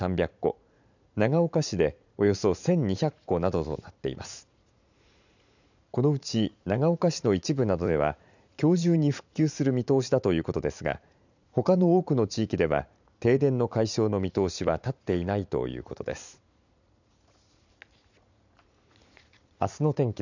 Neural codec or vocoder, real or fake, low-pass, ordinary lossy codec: vocoder, 44.1 kHz, 80 mel bands, Vocos; fake; 7.2 kHz; none